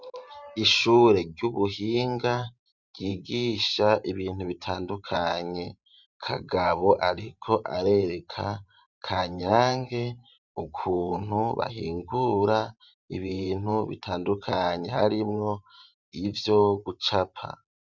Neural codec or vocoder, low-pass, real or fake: vocoder, 44.1 kHz, 128 mel bands every 512 samples, BigVGAN v2; 7.2 kHz; fake